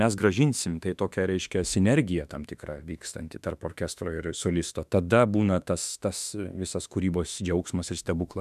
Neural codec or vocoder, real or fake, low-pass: autoencoder, 48 kHz, 32 numbers a frame, DAC-VAE, trained on Japanese speech; fake; 14.4 kHz